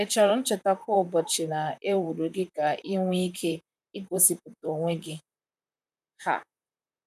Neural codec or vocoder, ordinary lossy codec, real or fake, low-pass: vocoder, 44.1 kHz, 128 mel bands, Pupu-Vocoder; none; fake; 14.4 kHz